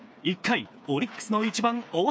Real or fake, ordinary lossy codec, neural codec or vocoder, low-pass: fake; none; codec, 16 kHz, 4 kbps, FreqCodec, smaller model; none